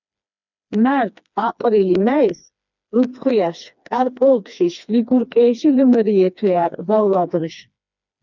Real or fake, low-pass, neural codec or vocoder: fake; 7.2 kHz; codec, 16 kHz, 2 kbps, FreqCodec, smaller model